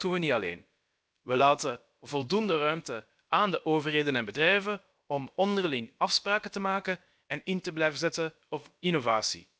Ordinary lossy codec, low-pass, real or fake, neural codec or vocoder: none; none; fake; codec, 16 kHz, about 1 kbps, DyCAST, with the encoder's durations